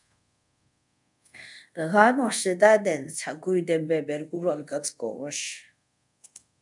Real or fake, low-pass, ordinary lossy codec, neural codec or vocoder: fake; 10.8 kHz; MP3, 96 kbps; codec, 24 kHz, 0.5 kbps, DualCodec